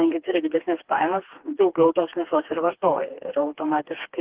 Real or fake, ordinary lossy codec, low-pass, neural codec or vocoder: fake; Opus, 16 kbps; 3.6 kHz; codec, 16 kHz, 2 kbps, FreqCodec, smaller model